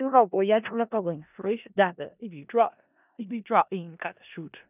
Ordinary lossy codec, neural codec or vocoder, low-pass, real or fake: none; codec, 16 kHz in and 24 kHz out, 0.4 kbps, LongCat-Audio-Codec, four codebook decoder; 3.6 kHz; fake